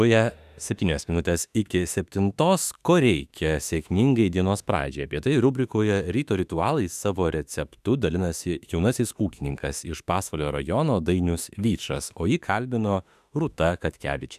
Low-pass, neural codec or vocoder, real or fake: 14.4 kHz; autoencoder, 48 kHz, 32 numbers a frame, DAC-VAE, trained on Japanese speech; fake